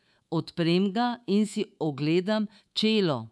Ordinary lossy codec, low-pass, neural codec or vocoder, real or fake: none; none; codec, 24 kHz, 3.1 kbps, DualCodec; fake